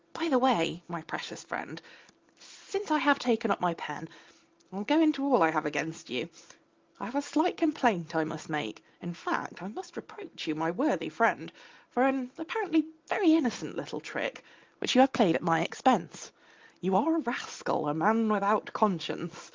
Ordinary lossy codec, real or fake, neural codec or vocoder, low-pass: Opus, 32 kbps; real; none; 7.2 kHz